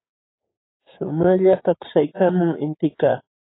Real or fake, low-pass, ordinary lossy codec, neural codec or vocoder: fake; 7.2 kHz; AAC, 16 kbps; codec, 16 kHz, 6 kbps, DAC